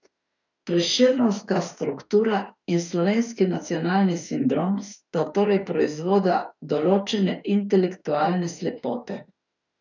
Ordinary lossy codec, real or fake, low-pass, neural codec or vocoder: none; fake; 7.2 kHz; autoencoder, 48 kHz, 32 numbers a frame, DAC-VAE, trained on Japanese speech